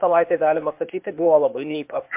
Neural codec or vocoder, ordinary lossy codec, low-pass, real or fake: codec, 16 kHz, 0.8 kbps, ZipCodec; MP3, 32 kbps; 3.6 kHz; fake